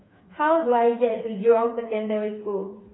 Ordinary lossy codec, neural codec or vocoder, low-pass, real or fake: AAC, 16 kbps; codec, 16 kHz, 4 kbps, FreqCodec, smaller model; 7.2 kHz; fake